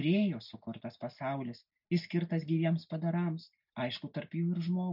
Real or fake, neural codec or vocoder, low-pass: real; none; 5.4 kHz